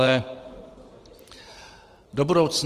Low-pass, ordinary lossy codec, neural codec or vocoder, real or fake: 14.4 kHz; Opus, 24 kbps; vocoder, 44.1 kHz, 128 mel bands every 512 samples, BigVGAN v2; fake